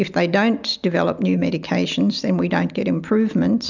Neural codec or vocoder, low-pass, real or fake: none; 7.2 kHz; real